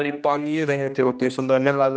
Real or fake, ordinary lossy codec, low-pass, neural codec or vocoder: fake; none; none; codec, 16 kHz, 1 kbps, X-Codec, HuBERT features, trained on general audio